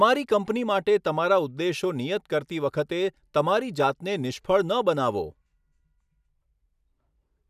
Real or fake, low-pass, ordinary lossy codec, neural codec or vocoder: real; 14.4 kHz; none; none